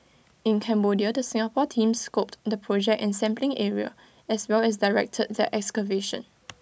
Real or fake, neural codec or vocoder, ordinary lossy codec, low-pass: real; none; none; none